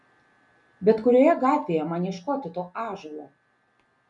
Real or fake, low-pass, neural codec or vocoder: real; 10.8 kHz; none